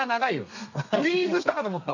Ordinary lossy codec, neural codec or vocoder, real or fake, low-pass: none; codec, 32 kHz, 1.9 kbps, SNAC; fake; 7.2 kHz